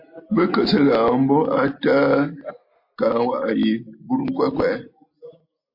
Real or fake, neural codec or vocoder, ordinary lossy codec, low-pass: real; none; MP3, 32 kbps; 5.4 kHz